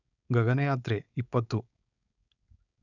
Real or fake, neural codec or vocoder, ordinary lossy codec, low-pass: fake; codec, 16 kHz, 4.8 kbps, FACodec; none; 7.2 kHz